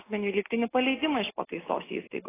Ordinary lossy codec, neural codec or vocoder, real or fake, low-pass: AAC, 16 kbps; none; real; 3.6 kHz